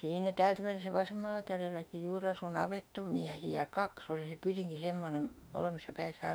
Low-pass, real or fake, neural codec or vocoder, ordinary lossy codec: none; fake; autoencoder, 48 kHz, 32 numbers a frame, DAC-VAE, trained on Japanese speech; none